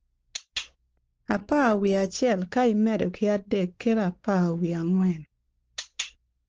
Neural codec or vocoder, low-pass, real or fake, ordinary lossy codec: codec, 16 kHz, 4 kbps, X-Codec, WavLM features, trained on Multilingual LibriSpeech; 7.2 kHz; fake; Opus, 16 kbps